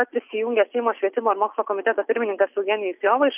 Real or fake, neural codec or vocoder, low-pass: real; none; 3.6 kHz